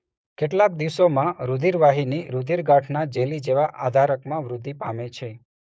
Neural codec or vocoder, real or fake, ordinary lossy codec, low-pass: codec, 16 kHz, 6 kbps, DAC; fake; none; none